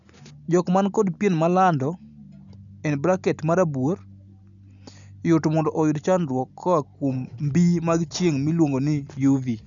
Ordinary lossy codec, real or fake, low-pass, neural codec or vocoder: none; real; 7.2 kHz; none